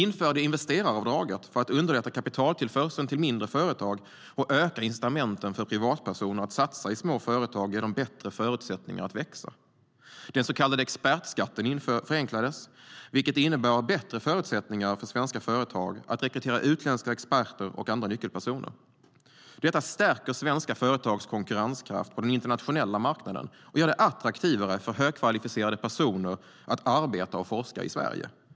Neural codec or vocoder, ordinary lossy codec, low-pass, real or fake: none; none; none; real